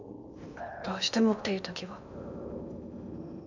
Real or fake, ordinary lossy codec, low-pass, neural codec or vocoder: fake; none; 7.2 kHz; codec, 16 kHz in and 24 kHz out, 0.8 kbps, FocalCodec, streaming, 65536 codes